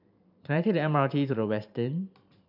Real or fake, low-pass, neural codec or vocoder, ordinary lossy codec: fake; 5.4 kHz; vocoder, 44.1 kHz, 128 mel bands every 512 samples, BigVGAN v2; none